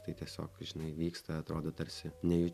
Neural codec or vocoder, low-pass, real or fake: none; 14.4 kHz; real